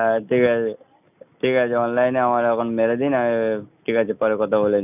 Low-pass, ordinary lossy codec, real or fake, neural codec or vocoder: 3.6 kHz; none; real; none